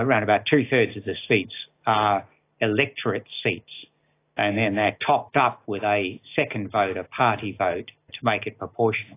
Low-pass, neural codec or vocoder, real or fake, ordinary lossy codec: 3.6 kHz; none; real; AAC, 24 kbps